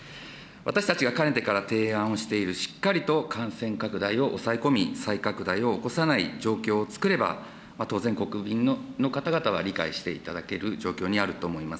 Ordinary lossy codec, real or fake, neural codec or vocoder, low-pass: none; real; none; none